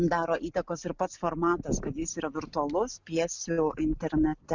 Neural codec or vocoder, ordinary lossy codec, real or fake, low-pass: none; Opus, 64 kbps; real; 7.2 kHz